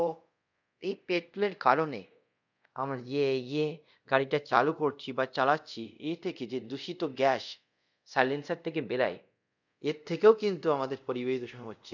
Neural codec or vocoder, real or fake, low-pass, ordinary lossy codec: codec, 24 kHz, 0.5 kbps, DualCodec; fake; 7.2 kHz; none